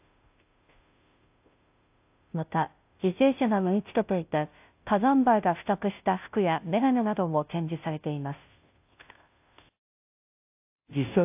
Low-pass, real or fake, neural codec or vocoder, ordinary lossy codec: 3.6 kHz; fake; codec, 16 kHz, 0.5 kbps, FunCodec, trained on Chinese and English, 25 frames a second; AAC, 32 kbps